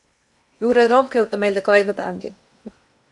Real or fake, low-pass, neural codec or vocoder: fake; 10.8 kHz; codec, 16 kHz in and 24 kHz out, 0.8 kbps, FocalCodec, streaming, 65536 codes